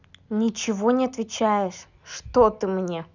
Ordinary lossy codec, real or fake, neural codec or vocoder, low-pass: none; real; none; 7.2 kHz